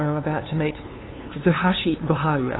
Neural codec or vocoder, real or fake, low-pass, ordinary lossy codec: codec, 16 kHz, 2 kbps, FunCodec, trained on LibriTTS, 25 frames a second; fake; 7.2 kHz; AAC, 16 kbps